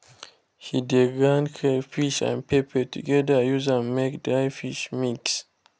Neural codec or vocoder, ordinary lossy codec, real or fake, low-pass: none; none; real; none